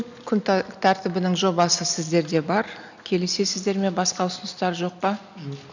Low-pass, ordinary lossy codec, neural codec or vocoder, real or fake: 7.2 kHz; none; none; real